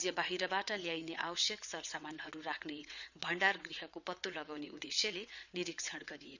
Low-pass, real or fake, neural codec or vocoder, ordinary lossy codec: 7.2 kHz; fake; vocoder, 22.05 kHz, 80 mel bands, WaveNeXt; none